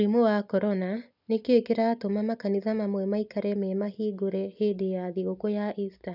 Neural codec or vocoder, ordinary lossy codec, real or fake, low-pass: none; none; real; 5.4 kHz